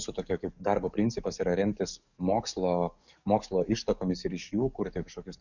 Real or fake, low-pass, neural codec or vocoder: fake; 7.2 kHz; codec, 44.1 kHz, 7.8 kbps, DAC